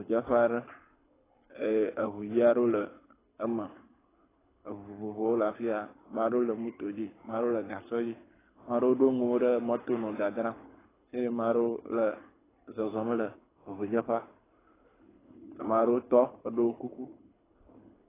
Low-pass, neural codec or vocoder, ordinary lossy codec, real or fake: 3.6 kHz; codec, 24 kHz, 6 kbps, HILCodec; AAC, 16 kbps; fake